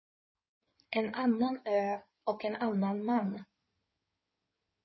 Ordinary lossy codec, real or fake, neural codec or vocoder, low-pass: MP3, 24 kbps; fake; codec, 16 kHz in and 24 kHz out, 2.2 kbps, FireRedTTS-2 codec; 7.2 kHz